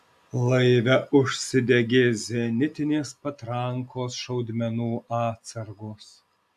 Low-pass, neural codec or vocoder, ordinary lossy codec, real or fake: 14.4 kHz; none; AAC, 96 kbps; real